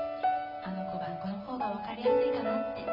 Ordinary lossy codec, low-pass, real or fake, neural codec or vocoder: none; 5.4 kHz; real; none